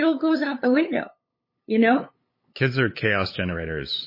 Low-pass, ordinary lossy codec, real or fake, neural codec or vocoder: 5.4 kHz; MP3, 24 kbps; fake; codec, 16 kHz, 8 kbps, FunCodec, trained on LibriTTS, 25 frames a second